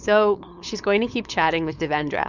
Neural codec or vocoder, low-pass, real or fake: codec, 16 kHz, 4.8 kbps, FACodec; 7.2 kHz; fake